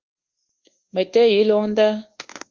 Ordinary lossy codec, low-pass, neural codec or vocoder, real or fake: Opus, 32 kbps; 7.2 kHz; codec, 24 kHz, 1.2 kbps, DualCodec; fake